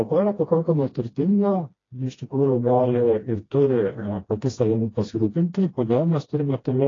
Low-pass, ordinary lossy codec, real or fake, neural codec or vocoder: 7.2 kHz; AAC, 32 kbps; fake; codec, 16 kHz, 1 kbps, FreqCodec, smaller model